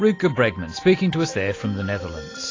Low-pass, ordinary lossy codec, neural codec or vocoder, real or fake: 7.2 kHz; AAC, 32 kbps; none; real